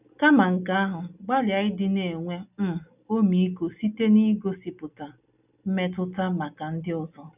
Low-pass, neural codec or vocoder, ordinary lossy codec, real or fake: 3.6 kHz; none; none; real